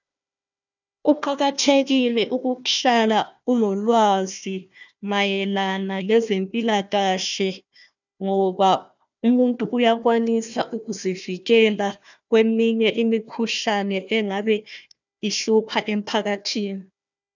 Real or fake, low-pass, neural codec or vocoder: fake; 7.2 kHz; codec, 16 kHz, 1 kbps, FunCodec, trained on Chinese and English, 50 frames a second